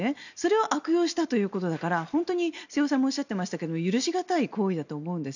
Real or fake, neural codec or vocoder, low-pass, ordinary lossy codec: real; none; 7.2 kHz; none